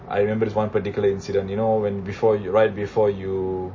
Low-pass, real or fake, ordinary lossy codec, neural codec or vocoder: 7.2 kHz; real; MP3, 32 kbps; none